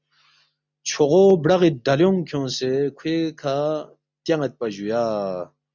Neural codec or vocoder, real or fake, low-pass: none; real; 7.2 kHz